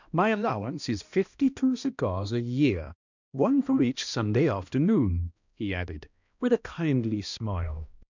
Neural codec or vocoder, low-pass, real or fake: codec, 16 kHz, 1 kbps, X-Codec, HuBERT features, trained on balanced general audio; 7.2 kHz; fake